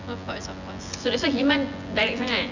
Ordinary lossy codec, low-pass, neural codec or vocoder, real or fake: none; 7.2 kHz; vocoder, 24 kHz, 100 mel bands, Vocos; fake